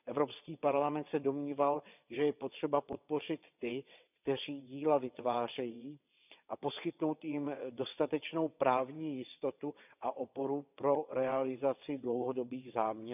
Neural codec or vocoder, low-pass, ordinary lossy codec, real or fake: vocoder, 22.05 kHz, 80 mel bands, WaveNeXt; 3.6 kHz; none; fake